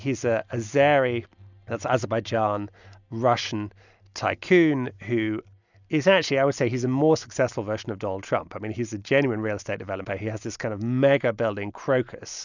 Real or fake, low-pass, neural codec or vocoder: real; 7.2 kHz; none